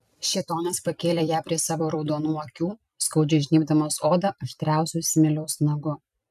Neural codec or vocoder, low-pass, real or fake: vocoder, 44.1 kHz, 128 mel bands every 256 samples, BigVGAN v2; 14.4 kHz; fake